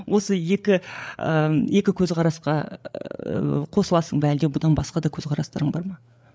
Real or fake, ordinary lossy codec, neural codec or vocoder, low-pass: fake; none; codec, 16 kHz, 8 kbps, FreqCodec, larger model; none